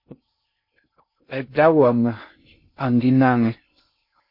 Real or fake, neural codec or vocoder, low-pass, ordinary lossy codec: fake; codec, 16 kHz in and 24 kHz out, 0.6 kbps, FocalCodec, streaming, 2048 codes; 5.4 kHz; MP3, 32 kbps